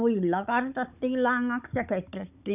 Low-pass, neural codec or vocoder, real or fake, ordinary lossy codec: 3.6 kHz; codec, 16 kHz, 16 kbps, FunCodec, trained on Chinese and English, 50 frames a second; fake; none